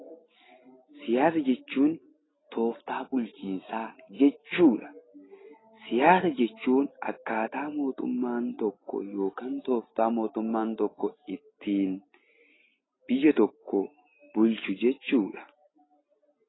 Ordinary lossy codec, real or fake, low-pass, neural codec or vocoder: AAC, 16 kbps; real; 7.2 kHz; none